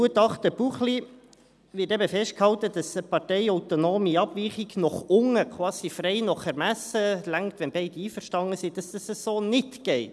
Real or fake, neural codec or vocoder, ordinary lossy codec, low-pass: real; none; none; none